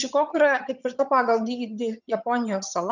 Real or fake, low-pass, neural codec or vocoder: fake; 7.2 kHz; vocoder, 22.05 kHz, 80 mel bands, HiFi-GAN